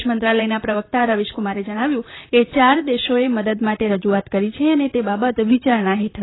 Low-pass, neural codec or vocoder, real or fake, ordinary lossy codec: 7.2 kHz; vocoder, 44.1 kHz, 128 mel bands, Pupu-Vocoder; fake; AAC, 16 kbps